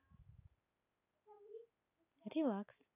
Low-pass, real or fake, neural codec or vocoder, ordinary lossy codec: 3.6 kHz; real; none; none